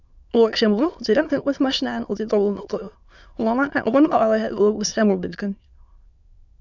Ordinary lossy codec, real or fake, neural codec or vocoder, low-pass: Opus, 64 kbps; fake; autoencoder, 22.05 kHz, a latent of 192 numbers a frame, VITS, trained on many speakers; 7.2 kHz